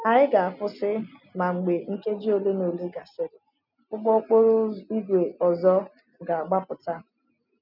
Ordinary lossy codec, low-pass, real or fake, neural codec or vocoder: none; 5.4 kHz; real; none